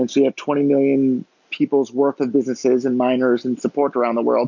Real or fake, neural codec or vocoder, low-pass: real; none; 7.2 kHz